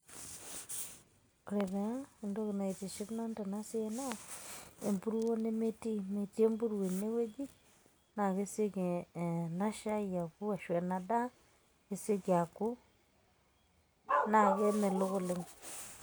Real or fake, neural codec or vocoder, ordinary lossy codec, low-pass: real; none; none; none